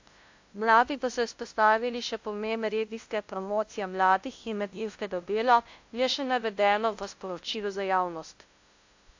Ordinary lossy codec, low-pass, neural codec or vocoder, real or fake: AAC, 48 kbps; 7.2 kHz; codec, 16 kHz, 0.5 kbps, FunCodec, trained on LibriTTS, 25 frames a second; fake